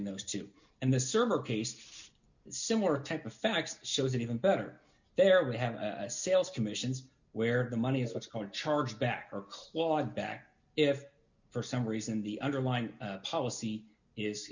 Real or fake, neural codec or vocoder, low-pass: real; none; 7.2 kHz